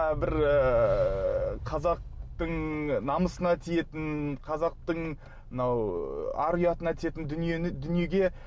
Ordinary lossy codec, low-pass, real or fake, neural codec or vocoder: none; none; real; none